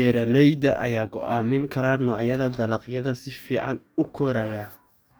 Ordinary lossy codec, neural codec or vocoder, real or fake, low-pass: none; codec, 44.1 kHz, 2.6 kbps, DAC; fake; none